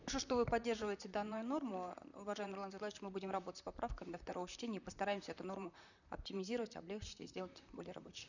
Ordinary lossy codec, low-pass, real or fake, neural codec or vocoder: none; 7.2 kHz; fake; vocoder, 44.1 kHz, 128 mel bands, Pupu-Vocoder